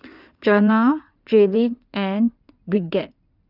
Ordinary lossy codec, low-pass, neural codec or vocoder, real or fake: none; 5.4 kHz; codec, 16 kHz in and 24 kHz out, 2.2 kbps, FireRedTTS-2 codec; fake